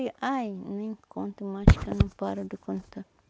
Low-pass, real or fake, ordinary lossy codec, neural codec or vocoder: none; real; none; none